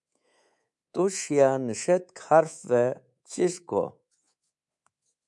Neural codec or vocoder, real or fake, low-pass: codec, 24 kHz, 3.1 kbps, DualCodec; fake; 10.8 kHz